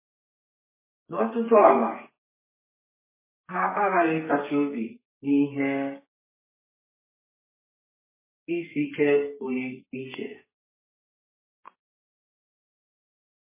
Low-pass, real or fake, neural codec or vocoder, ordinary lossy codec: 3.6 kHz; fake; codec, 32 kHz, 1.9 kbps, SNAC; MP3, 16 kbps